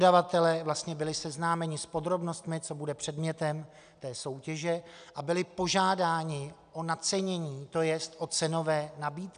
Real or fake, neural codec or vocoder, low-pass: real; none; 9.9 kHz